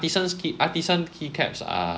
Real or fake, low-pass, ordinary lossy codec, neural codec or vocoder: real; none; none; none